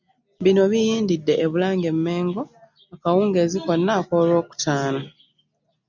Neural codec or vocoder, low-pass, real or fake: none; 7.2 kHz; real